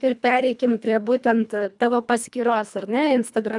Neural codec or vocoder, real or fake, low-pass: codec, 24 kHz, 1.5 kbps, HILCodec; fake; 10.8 kHz